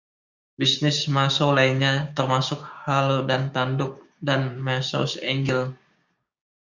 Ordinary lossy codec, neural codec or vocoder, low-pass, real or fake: Opus, 64 kbps; codec, 16 kHz, 6 kbps, DAC; 7.2 kHz; fake